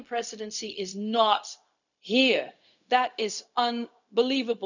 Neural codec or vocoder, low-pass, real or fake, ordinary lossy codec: codec, 16 kHz, 0.4 kbps, LongCat-Audio-Codec; 7.2 kHz; fake; none